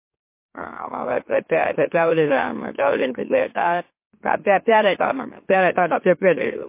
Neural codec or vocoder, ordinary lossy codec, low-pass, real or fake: autoencoder, 44.1 kHz, a latent of 192 numbers a frame, MeloTTS; MP3, 24 kbps; 3.6 kHz; fake